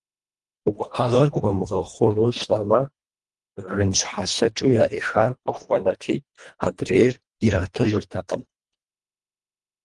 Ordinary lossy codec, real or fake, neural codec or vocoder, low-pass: Opus, 32 kbps; fake; codec, 24 kHz, 1.5 kbps, HILCodec; 10.8 kHz